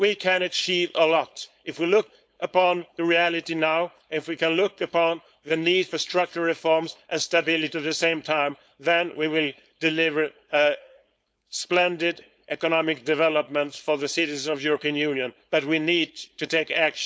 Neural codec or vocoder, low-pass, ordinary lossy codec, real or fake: codec, 16 kHz, 4.8 kbps, FACodec; none; none; fake